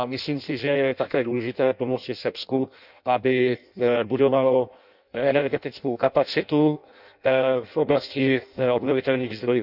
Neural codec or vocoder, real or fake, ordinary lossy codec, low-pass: codec, 16 kHz in and 24 kHz out, 0.6 kbps, FireRedTTS-2 codec; fake; none; 5.4 kHz